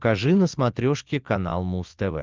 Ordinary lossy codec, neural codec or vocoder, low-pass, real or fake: Opus, 32 kbps; none; 7.2 kHz; real